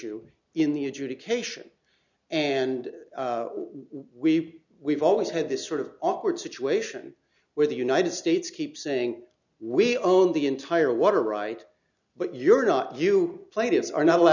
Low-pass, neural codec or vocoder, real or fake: 7.2 kHz; none; real